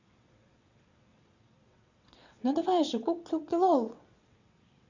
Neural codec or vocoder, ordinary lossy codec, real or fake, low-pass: vocoder, 22.05 kHz, 80 mel bands, WaveNeXt; Opus, 64 kbps; fake; 7.2 kHz